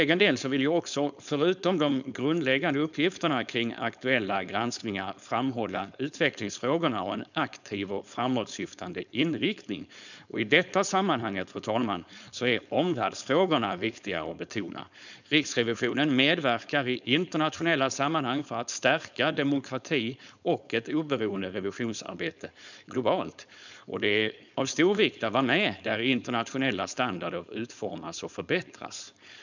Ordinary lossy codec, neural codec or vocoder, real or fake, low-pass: none; codec, 16 kHz, 4.8 kbps, FACodec; fake; 7.2 kHz